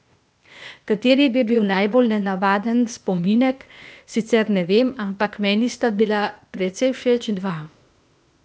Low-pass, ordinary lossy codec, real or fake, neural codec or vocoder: none; none; fake; codec, 16 kHz, 0.8 kbps, ZipCodec